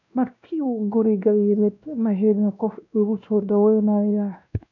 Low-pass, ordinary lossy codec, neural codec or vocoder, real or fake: 7.2 kHz; none; codec, 16 kHz, 1 kbps, X-Codec, WavLM features, trained on Multilingual LibriSpeech; fake